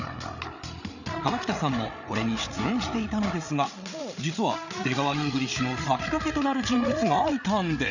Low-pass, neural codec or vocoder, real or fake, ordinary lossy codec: 7.2 kHz; codec, 16 kHz, 8 kbps, FreqCodec, larger model; fake; none